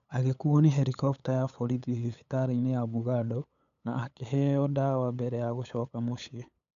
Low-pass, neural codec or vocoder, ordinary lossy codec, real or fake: 7.2 kHz; codec, 16 kHz, 8 kbps, FunCodec, trained on LibriTTS, 25 frames a second; none; fake